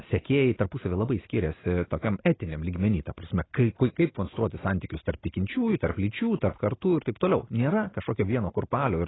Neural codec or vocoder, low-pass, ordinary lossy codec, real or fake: none; 7.2 kHz; AAC, 16 kbps; real